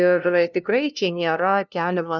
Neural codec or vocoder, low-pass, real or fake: codec, 16 kHz, 0.5 kbps, FunCodec, trained on LibriTTS, 25 frames a second; 7.2 kHz; fake